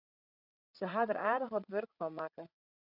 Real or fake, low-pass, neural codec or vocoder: fake; 5.4 kHz; codec, 16 kHz, 16 kbps, FreqCodec, larger model